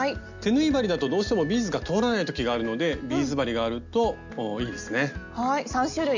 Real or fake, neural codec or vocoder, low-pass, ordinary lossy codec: real; none; 7.2 kHz; none